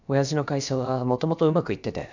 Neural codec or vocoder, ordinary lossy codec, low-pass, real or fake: codec, 16 kHz, about 1 kbps, DyCAST, with the encoder's durations; none; 7.2 kHz; fake